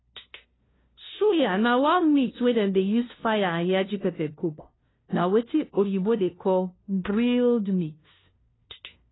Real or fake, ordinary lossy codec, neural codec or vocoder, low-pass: fake; AAC, 16 kbps; codec, 16 kHz, 0.5 kbps, FunCodec, trained on LibriTTS, 25 frames a second; 7.2 kHz